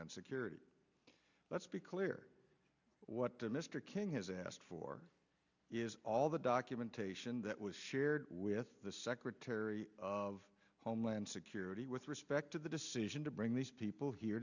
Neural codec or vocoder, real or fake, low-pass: none; real; 7.2 kHz